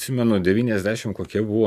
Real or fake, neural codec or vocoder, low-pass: real; none; 14.4 kHz